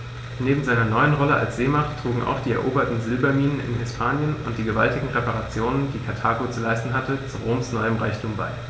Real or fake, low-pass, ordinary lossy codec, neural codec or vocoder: real; none; none; none